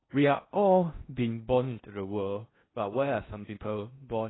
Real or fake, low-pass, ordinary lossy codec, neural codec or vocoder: fake; 7.2 kHz; AAC, 16 kbps; codec, 16 kHz in and 24 kHz out, 0.6 kbps, FocalCodec, streaming, 4096 codes